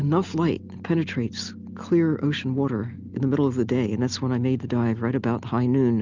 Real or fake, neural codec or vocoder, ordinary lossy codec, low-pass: real; none; Opus, 32 kbps; 7.2 kHz